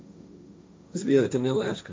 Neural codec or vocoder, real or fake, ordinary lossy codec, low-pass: codec, 16 kHz, 1.1 kbps, Voila-Tokenizer; fake; none; none